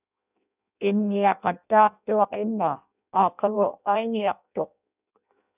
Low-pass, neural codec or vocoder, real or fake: 3.6 kHz; codec, 16 kHz in and 24 kHz out, 0.6 kbps, FireRedTTS-2 codec; fake